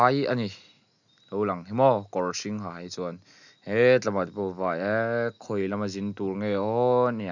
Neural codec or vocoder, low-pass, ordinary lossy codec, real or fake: none; 7.2 kHz; none; real